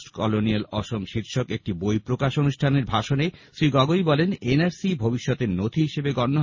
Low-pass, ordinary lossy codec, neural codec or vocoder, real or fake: 7.2 kHz; MP3, 32 kbps; none; real